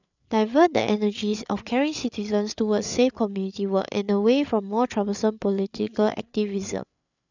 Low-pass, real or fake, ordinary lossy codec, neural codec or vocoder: 7.2 kHz; fake; none; codec, 16 kHz, 8 kbps, FreqCodec, larger model